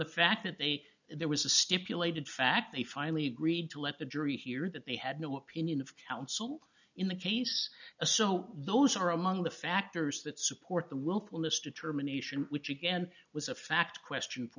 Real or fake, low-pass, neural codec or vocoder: real; 7.2 kHz; none